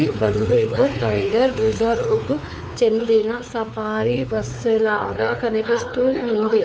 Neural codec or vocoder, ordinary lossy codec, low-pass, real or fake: codec, 16 kHz, 4 kbps, X-Codec, WavLM features, trained on Multilingual LibriSpeech; none; none; fake